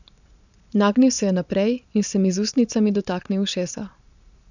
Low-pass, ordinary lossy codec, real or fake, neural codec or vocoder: 7.2 kHz; none; fake; vocoder, 22.05 kHz, 80 mel bands, Vocos